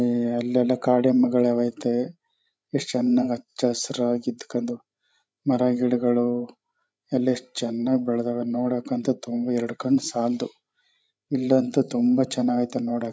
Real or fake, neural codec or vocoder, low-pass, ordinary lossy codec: fake; codec, 16 kHz, 16 kbps, FreqCodec, larger model; none; none